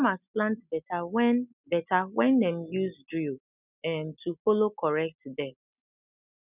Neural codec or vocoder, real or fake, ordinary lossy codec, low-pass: none; real; none; 3.6 kHz